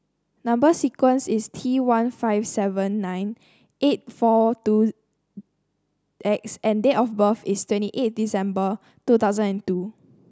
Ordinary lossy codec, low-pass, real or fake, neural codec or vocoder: none; none; real; none